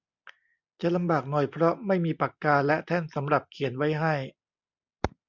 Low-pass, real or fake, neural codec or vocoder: 7.2 kHz; real; none